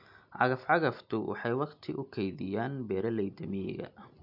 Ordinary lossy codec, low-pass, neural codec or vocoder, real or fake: MP3, 48 kbps; 5.4 kHz; none; real